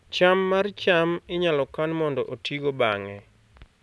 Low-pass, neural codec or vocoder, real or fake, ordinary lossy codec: none; none; real; none